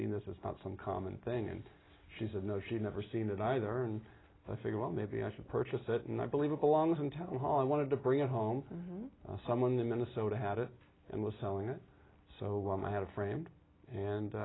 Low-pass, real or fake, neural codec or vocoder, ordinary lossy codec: 7.2 kHz; real; none; AAC, 16 kbps